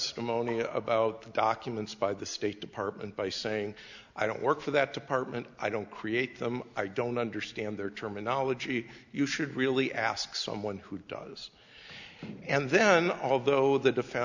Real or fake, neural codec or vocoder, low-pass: real; none; 7.2 kHz